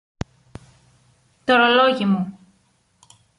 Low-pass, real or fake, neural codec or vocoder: 10.8 kHz; real; none